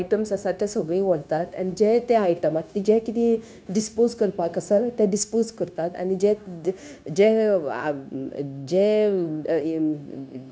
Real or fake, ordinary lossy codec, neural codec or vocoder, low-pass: fake; none; codec, 16 kHz, 0.9 kbps, LongCat-Audio-Codec; none